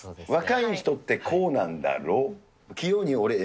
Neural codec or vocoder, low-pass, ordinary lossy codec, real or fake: none; none; none; real